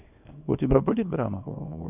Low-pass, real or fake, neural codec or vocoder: 3.6 kHz; fake; codec, 24 kHz, 0.9 kbps, WavTokenizer, small release